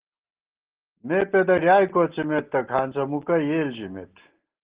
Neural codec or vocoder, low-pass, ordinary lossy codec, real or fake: none; 3.6 kHz; Opus, 16 kbps; real